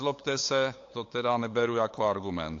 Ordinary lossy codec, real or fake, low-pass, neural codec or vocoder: AAC, 48 kbps; fake; 7.2 kHz; codec, 16 kHz, 8 kbps, FunCodec, trained on LibriTTS, 25 frames a second